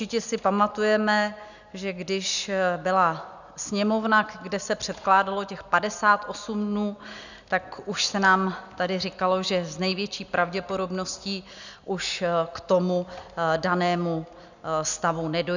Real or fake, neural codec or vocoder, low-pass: real; none; 7.2 kHz